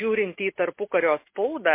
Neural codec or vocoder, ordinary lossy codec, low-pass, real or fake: none; MP3, 24 kbps; 3.6 kHz; real